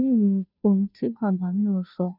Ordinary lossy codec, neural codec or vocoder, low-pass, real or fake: none; codec, 16 kHz, 0.5 kbps, FunCodec, trained on Chinese and English, 25 frames a second; 5.4 kHz; fake